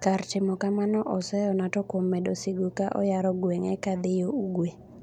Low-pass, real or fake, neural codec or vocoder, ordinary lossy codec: 19.8 kHz; real; none; none